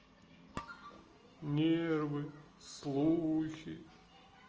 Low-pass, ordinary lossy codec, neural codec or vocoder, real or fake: 7.2 kHz; Opus, 24 kbps; none; real